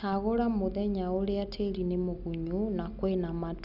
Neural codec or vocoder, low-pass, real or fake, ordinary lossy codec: none; 5.4 kHz; real; none